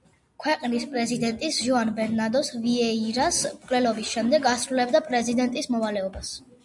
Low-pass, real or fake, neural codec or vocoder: 10.8 kHz; real; none